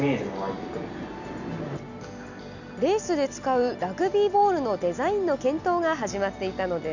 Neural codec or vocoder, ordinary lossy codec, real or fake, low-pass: none; none; real; 7.2 kHz